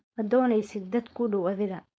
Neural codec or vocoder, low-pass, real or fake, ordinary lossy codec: codec, 16 kHz, 4.8 kbps, FACodec; none; fake; none